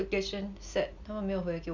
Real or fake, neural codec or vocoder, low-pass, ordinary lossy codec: real; none; 7.2 kHz; none